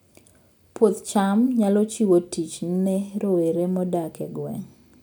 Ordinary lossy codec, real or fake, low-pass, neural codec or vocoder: none; real; none; none